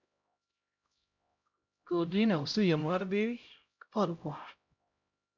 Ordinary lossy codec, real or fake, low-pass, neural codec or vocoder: MP3, 64 kbps; fake; 7.2 kHz; codec, 16 kHz, 0.5 kbps, X-Codec, HuBERT features, trained on LibriSpeech